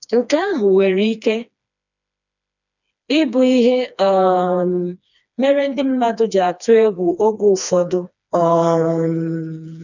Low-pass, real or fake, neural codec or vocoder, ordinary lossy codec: 7.2 kHz; fake; codec, 16 kHz, 2 kbps, FreqCodec, smaller model; none